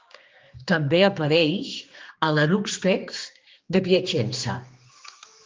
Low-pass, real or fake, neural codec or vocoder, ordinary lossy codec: 7.2 kHz; fake; codec, 16 kHz, 2 kbps, X-Codec, HuBERT features, trained on general audio; Opus, 32 kbps